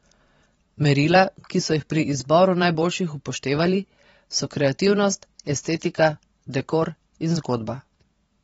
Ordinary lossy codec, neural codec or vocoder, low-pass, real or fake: AAC, 24 kbps; vocoder, 44.1 kHz, 128 mel bands every 512 samples, BigVGAN v2; 19.8 kHz; fake